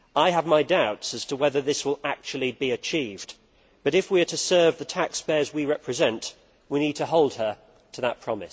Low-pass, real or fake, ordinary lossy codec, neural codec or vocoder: none; real; none; none